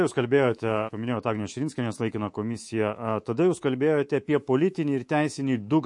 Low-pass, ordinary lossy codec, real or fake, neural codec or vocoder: 10.8 kHz; MP3, 48 kbps; fake; codec, 24 kHz, 3.1 kbps, DualCodec